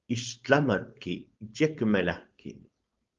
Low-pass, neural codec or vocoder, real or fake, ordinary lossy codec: 7.2 kHz; codec, 16 kHz, 4.8 kbps, FACodec; fake; Opus, 32 kbps